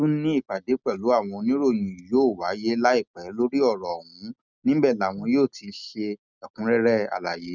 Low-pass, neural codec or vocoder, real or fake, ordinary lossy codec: 7.2 kHz; none; real; none